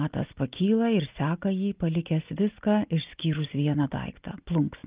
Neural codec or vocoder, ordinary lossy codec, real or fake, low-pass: none; Opus, 32 kbps; real; 3.6 kHz